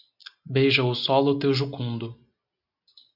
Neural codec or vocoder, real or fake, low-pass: none; real; 5.4 kHz